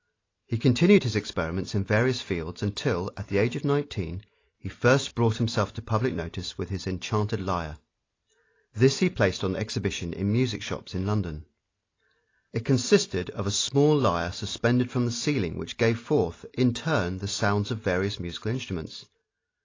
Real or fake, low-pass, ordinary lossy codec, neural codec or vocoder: real; 7.2 kHz; AAC, 32 kbps; none